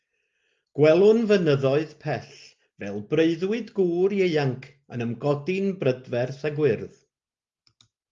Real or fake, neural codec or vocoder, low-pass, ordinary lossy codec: real; none; 7.2 kHz; Opus, 32 kbps